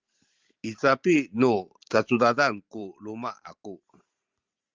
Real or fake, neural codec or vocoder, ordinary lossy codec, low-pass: fake; vocoder, 44.1 kHz, 128 mel bands every 512 samples, BigVGAN v2; Opus, 32 kbps; 7.2 kHz